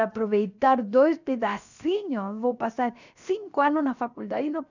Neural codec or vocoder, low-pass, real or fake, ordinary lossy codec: codec, 16 kHz, 0.7 kbps, FocalCodec; 7.2 kHz; fake; none